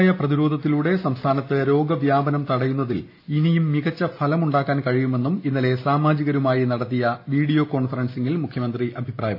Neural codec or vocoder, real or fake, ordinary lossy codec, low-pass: none; real; AAC, 32 kbps; 5.4 kHz